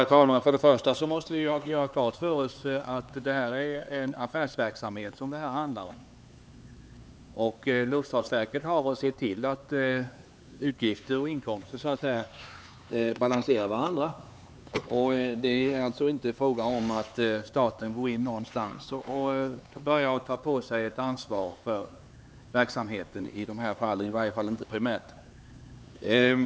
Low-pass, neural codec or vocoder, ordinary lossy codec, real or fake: none; codec, 16 kHz, 4 kbps, X-Codec, HuBERT features, trained on LibriSpeech; none; fake